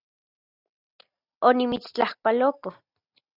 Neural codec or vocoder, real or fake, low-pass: none; real; 5.4 kHz